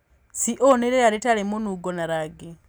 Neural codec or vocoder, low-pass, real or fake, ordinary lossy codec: none; none; real; none